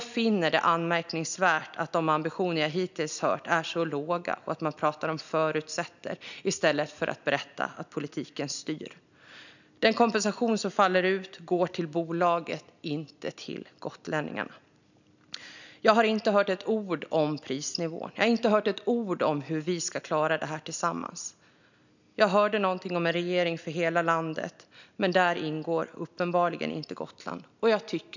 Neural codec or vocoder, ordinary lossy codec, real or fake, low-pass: none; none; real; 7.2 kHz